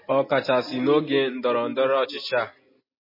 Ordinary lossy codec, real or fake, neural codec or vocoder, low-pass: MP3, 24 kbps; real; none; 5.4 kHz